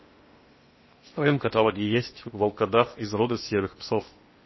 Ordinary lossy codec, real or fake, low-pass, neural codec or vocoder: MP3, 24 kbps; fake; 7.2 kHz; codec, 16 kHz in and 24 kHz out, 0.8 kbps, FocalCodec, streaming, 65536 codes